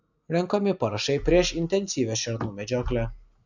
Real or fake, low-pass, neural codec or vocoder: real; 7.2 kHz; none